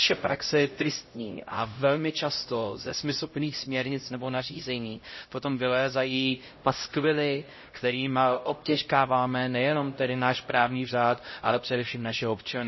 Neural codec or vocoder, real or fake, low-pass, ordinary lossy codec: codec, 16 kHz, 0.5 kbps, X-Codec, HuBERT features, trained on LibriSpeech; fake; 7.2 kHz; MP3, 24 kbps